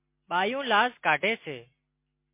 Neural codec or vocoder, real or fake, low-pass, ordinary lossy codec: none; real; 3.6 kHz; MP3, 24 kbps